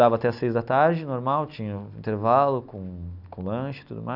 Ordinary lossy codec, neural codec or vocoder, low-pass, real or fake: none; none; 5.4 kHz; real